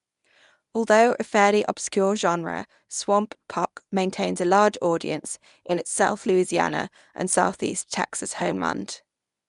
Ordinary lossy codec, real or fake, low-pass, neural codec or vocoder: none; fake; 10.8 kHz; codec, 24 kHz, 0.9 kbps, WavTokenizer, medium speech release version 1